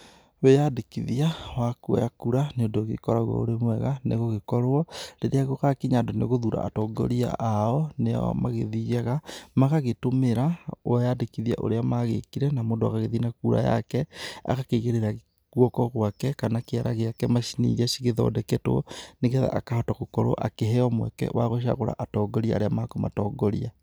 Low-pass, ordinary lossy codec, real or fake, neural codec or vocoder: none; none; real; none